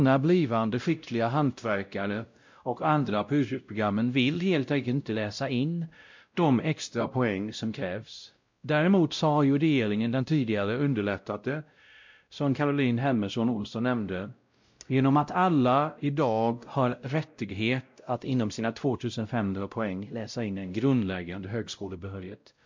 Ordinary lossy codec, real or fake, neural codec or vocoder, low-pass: MP3, 64 kbps; fake; codec, 16 kHz, 0.5 kbps, X-Codec, WavLM features, trained on Multilingual LibriSpeech; 7.2 kHz